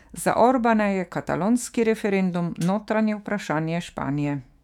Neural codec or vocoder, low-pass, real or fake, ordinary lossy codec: autoencoder, 48 kHz, 128 numbers a frame, DAC-VAE, trained on Japanese speech; 19.8 kHz; fake; none